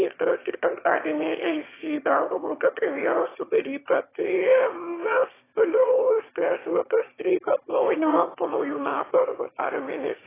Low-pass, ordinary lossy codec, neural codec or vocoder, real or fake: 3.6 kHz; AAC, 16 kbps; autoencoder, 22.05 kHz, a latent of 192 numbers a frame, VITS, trained on one speaker; fake